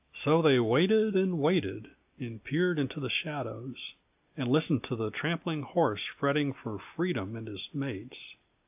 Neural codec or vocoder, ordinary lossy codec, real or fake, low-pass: none; AAC, 32 kbps; real; 3.6 kHz